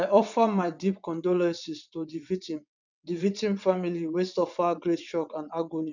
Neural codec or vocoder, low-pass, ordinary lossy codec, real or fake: vocoder, 22.05 kHz, 80 mel bands, WaveNeXt; 7.2 kHz; none; fake